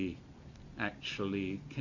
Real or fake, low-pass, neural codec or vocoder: real; 7.2 kHz; none